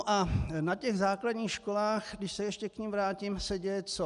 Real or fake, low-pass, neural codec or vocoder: real; 10.8 kHz; none